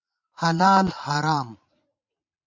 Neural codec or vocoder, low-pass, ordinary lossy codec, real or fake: vocoder, 44.1 kHz, 80 mel bands, Vocos; 7.2 kHz; MP3, 48 kbps; fake